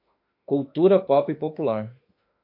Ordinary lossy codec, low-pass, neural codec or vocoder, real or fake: MP3, 48 kbps; 5.4 kHz; autoencoder, 48 kHz, 32 numbers a frame, DAC-VAE, trained on Japanese speech; fake